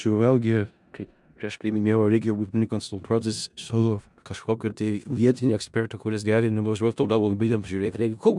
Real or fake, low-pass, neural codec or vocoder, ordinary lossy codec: fake; 10.8 kHz; codec, 16 kHz in and 24 kHz out, 0.4 kbps, LongCat-Audio-Codec, four codebook decoder; MP3, 96 kbps